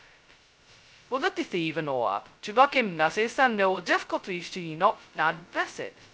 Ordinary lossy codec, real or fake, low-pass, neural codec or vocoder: none; fake; none; codec, 16 kHz, 0.2 kbps, FocalCodec